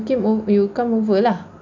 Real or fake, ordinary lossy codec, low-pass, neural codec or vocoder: real; none; 7.2 kHz; none